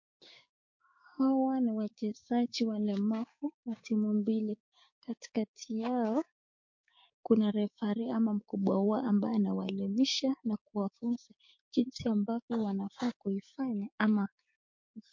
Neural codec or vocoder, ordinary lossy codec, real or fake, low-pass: codec, 16 kHz, 6 kbps, DAC; MP3, 48 kbps; fake; 7.2 kHz